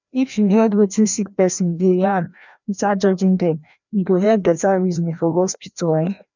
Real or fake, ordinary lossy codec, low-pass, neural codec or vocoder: fake; none; 7.2 kHz; codec, 16 kHz, 1 kbps, FreqCodec, larger model